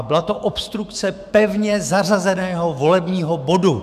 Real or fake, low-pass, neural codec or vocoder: fake; 14.4 kHz; autoencoder, 48 kHz, 128 numbers a frame, DAC-VAE, trained on Japanese speech